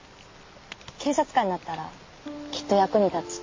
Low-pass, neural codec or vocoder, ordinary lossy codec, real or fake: 7.2 kHz; none; MP3, 32 kbps; real